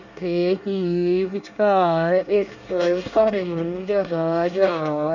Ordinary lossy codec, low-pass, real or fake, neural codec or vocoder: none; 7.2 kHz; fake; codec, 24 kHz, 1 kbps, SNAC